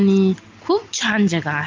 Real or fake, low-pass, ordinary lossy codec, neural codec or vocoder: real; 7.2 kHz; Opus, 32 kbps; none